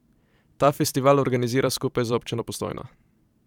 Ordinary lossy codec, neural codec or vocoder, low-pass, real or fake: none; vocoder, 44.1 kHz, 128 mel bands every 512 samples, BigVGAN v2; 19.8 kHz; fake